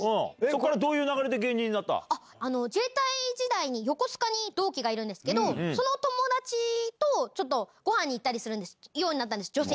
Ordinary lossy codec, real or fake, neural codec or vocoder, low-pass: none; real; none; none